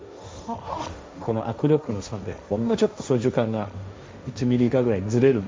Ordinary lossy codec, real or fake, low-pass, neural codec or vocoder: none; fake; none; codec, 16 kHz, 1.1 kbps, Voila-Tokenizer